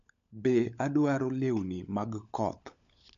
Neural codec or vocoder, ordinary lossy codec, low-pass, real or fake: codec, 16 kHz, 16 kbps, FunCodec, trained on LibriTTS, 50 frames a second; MP3, 64 kbps; 7.2 kHz; fake